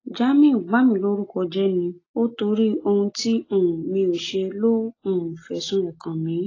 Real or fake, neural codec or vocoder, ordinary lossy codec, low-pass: real; none; AAC, 32 kbps; 7.2 kHz